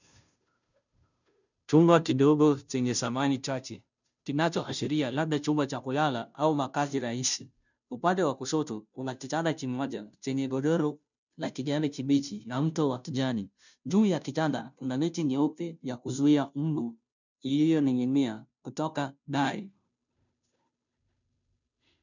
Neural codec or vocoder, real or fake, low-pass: codec, 16 kHz, 0.5 kbps, FunCodec, trained on Chinese and English, 25 frames a second; fake; 7.2 kHz